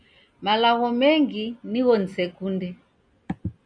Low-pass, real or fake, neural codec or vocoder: 9.9 kHz; real; none